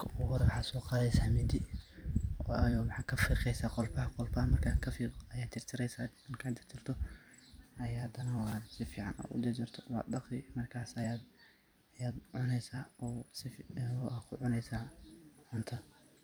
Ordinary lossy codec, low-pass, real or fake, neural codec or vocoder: none; none; fake; vocoder, 44.1 kHz, 128 mel bands every 256 samples, BigVGAN v2